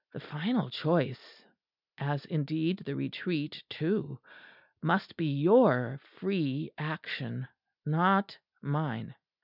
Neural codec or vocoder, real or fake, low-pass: none; real; 5.4 kHz